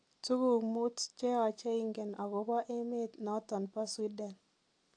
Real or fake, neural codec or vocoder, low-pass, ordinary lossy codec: real; none; 9.9 kHz; AAC, 48 kbps